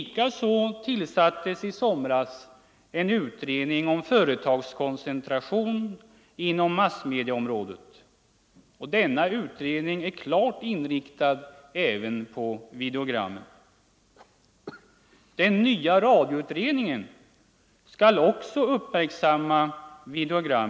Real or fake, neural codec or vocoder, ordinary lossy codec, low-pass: real; none; none; none